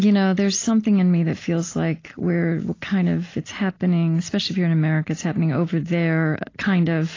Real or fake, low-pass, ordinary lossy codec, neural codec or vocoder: real; 7.2 kHz; AAC, 32 kbps; none